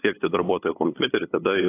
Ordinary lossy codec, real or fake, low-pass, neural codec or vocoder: AAC, 16 kbps; fake; 3.6 kHz; codec, 16 kHz, 8 kbps, FunCodec, trained on LibriTTS, 25 frames a second